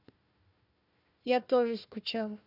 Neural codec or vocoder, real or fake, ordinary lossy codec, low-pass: codec, 16 kHz, 1 kbps, FunCodec, trained on Chinese and English, 50 frames a second; fake; none; 5.4 kHz